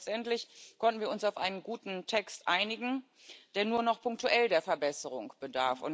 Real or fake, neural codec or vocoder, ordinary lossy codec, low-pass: real; none; none; none